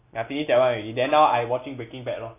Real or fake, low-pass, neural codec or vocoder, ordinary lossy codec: real; 3.6 kHz; none; AAC, 24 kbps